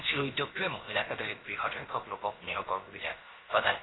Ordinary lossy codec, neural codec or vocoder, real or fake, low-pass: AAC, 16 kbps; codec, 16 kHz, about 1 kbps, DyCAST, with the encoder's durations; fake; 7.2 kHz